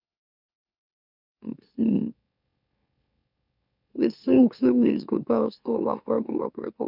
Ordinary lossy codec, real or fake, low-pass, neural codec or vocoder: none; fake; 5.4 kHz; autoencoder, 44.1 kHz, a latent of 192 numbers a frame, MeloTTS